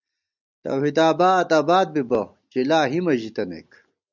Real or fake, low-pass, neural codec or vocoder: real; 7.2 kHz; none